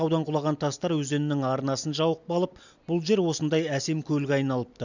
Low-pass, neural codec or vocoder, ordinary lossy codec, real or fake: 7.2 kHz; none; none; real